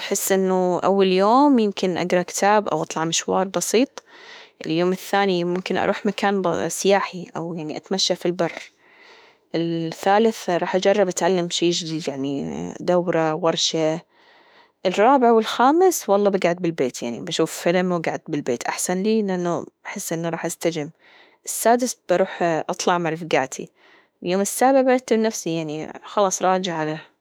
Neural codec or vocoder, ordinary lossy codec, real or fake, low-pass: autoencoder, 48 kHz, 32 numbers a frame, DAC-VAE, trained on Japanese speech; none; fake; none